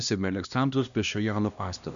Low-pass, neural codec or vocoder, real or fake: 7.2 kHz; codec, 16 kHz, 1 kbps, X-Codec, HuBERT features, trained on LibriSpeech; fake